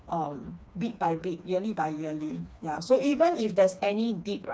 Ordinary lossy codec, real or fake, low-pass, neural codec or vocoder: none; fake; none; codec, 16 kHz, 2 kbps, FreqCodec, smaller model